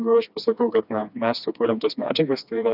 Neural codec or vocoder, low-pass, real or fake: codec, 16 kHz, 2 kbps, FreqCodec, smaller model; 5.4 kHz; fake